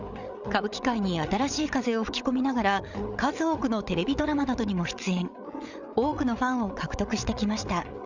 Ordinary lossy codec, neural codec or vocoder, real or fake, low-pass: none; codec, 16 kHz, 8 kbps, FreqCodec, larger model; fake; 7.2 kHz